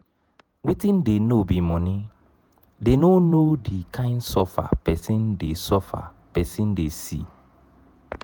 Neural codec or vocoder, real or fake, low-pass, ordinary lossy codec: vocoder, 48 kHz, 128 mel bands, Vocos; fake; none; none